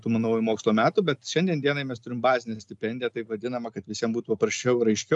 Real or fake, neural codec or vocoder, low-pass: real; none; 10.8 kHz